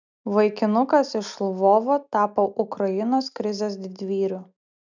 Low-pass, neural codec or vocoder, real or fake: 7.2 kHz; none; real